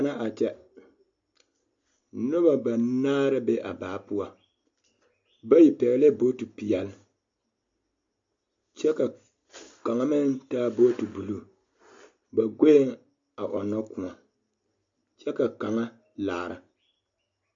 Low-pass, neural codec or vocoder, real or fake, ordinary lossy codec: 7.2 kHz; none; real; MP3, 48 kbps